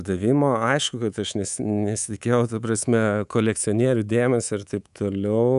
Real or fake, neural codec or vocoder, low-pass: fake; codec, 24 kHz, 3.1 kbps, DualCodec; 10.8 kHz